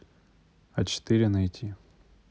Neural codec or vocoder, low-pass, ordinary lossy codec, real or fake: none; none; none; real